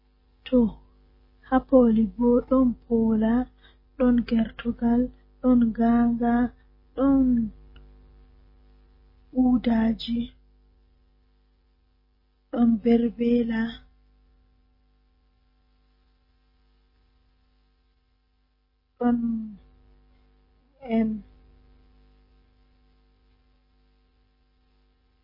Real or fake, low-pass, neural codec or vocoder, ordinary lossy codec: real; 5.4 kHz; none; MP3, 24 kbps